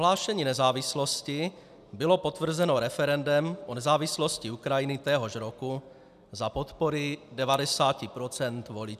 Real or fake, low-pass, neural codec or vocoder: real; 14.4 kHz; none